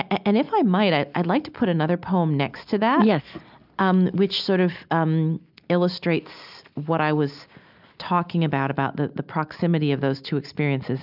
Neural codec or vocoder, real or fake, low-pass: none; real; 5.4 kHz